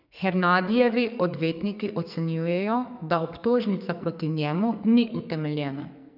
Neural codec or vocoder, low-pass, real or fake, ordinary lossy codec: codec, 44.1 kHz, 2.6 kbps, SNAC; 5.4 kHz; fake; none